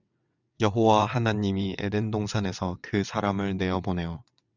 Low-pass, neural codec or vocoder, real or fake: 7.2 kHz; vocoder, 22.05 kHz, 80 mel bands, WaveNeXt; fake